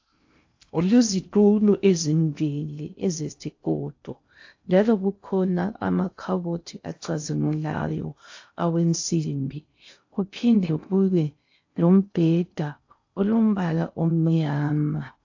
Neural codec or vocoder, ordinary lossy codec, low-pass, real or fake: codec, 16 kHz in and 24 kHz out, 0.6 kbps, FocalCodec, streaming, 4096 codes; AAC, 48 kbps; 7.2 kHz; fake